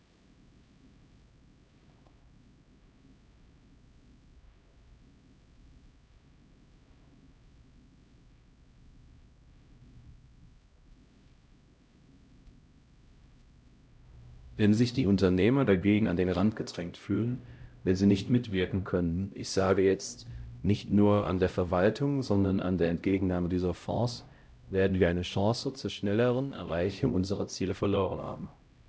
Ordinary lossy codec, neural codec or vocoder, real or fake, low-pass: none; codec, 16 kHz, 0.5 kbps, X-Codec, HuBERT features, trained on LibriSpeech; fake; none